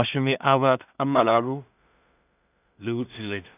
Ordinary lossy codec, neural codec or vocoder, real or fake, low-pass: none; codec, 16 kHz in and 24 kHz out, 0.4 kbps, LongCat-Audio-Codec, two codebook decoder; fake; 3.6 kHz